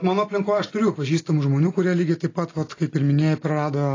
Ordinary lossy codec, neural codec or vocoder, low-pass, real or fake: AAC, 32 kbps; none; 7.2 kHz; real